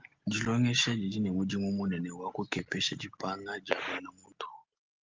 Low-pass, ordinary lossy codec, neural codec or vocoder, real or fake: 7.2 kHz; Opus, 32 kbps; none; real